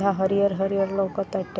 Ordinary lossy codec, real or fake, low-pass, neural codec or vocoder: none; real; none; none